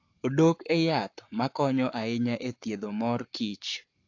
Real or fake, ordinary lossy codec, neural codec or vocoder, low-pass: fake; AAC, 48 kbps; codec, 44.1 kHz, 7.8 kbps, Pupu-Codec; 7.2 kHz